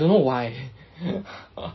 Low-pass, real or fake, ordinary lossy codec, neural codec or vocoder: 7.2 kHz; real; MP3, 24 kbps; none